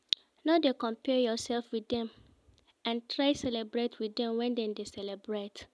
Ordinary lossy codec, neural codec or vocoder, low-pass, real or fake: none; none; none; real